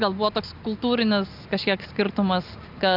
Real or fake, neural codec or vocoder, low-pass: real; none; 5.4 kHz